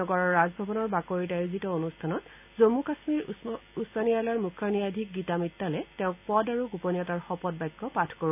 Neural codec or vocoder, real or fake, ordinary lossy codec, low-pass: none; real; AAC, 32 kbps; 3.6 kHz